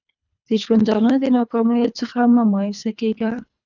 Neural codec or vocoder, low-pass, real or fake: codec, 24 kHz, 3 kbps, HILCodec; 7.2 kHz; fake